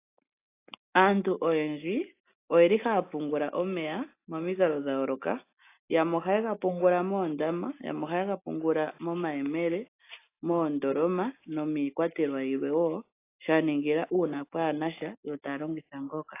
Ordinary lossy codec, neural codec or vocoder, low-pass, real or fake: AAC, 24 kbps; none; 3.6 kHz; real